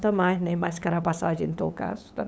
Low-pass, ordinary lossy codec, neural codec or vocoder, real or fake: none; none; codec, 16 kHz, 8 kbps, FunCodec, trained on LibriTTS, 25 frames a second; fake